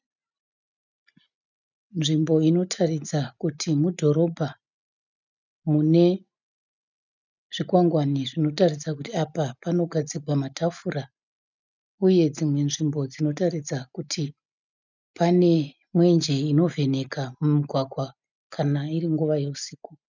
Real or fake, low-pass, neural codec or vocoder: real; 7.2 kHz; none